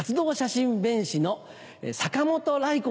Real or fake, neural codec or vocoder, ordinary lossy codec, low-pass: real; none; none; none